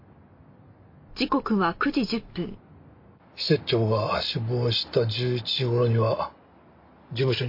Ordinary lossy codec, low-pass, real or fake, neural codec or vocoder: MP3, 32 kbps; 5.4 kHz; real; none